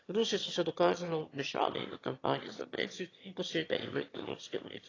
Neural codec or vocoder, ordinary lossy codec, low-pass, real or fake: autoencoder, 22.05 kHz, a latent of 192 numbers a frame, VITS, trained on one speaker; AAC, 32 kbps; 7.2 kHz; fake